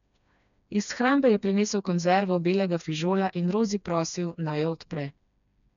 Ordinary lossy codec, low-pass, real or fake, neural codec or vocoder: none; 7.2 kHz; fake; codec, 16 kHz, 2 kbps, FreqCodec, smaller model